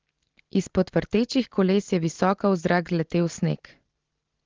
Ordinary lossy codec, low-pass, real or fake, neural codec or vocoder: Opus, 16 kbps; 7.2 kHz; real; none